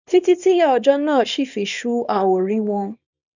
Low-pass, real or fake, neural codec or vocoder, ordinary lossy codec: 7.2 kHz; fake; codec, 24 kHz, 0.9 kbps, WavTokenizer, small release; none